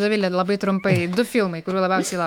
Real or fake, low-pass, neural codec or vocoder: fake; 19.8 kHz; autoencoder, 48 kHz, 128 numbers a frame, DAC-VAE, trained on Japanese speech